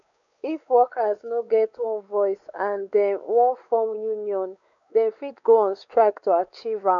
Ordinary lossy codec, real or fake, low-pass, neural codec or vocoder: none; fake; 7.2 kHz; codec, 16 kHz, 4 kbps, X-Codec, WavLM features, trained on Multilingual LibriSpeech